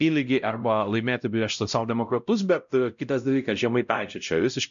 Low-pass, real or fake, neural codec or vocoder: 7.2 kHz; fake; codec, 16 kHz, 0.5 kbps, X-Codec, WavLM features, trained on Multilingual LibriSpeech